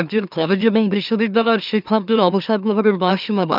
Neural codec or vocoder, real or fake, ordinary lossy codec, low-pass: autoencoder, 44.1 kHz, a latent of 192 numbers a frame, MeloTTS; fake; none; 5.4 kHz